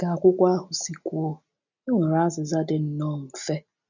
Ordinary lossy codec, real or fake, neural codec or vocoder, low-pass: none; real; none; 7.2 kHz